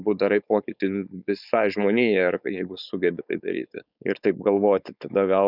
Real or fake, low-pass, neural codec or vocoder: fake; 5.4 kHz; codec, 16 kHz, 4.8 kbps, FACodec